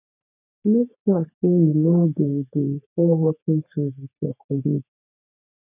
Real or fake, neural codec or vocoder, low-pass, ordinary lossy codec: fake; codec, 44.1 kHz, 3.4 kbps, Pupu-Codec; 3.6 kHz; none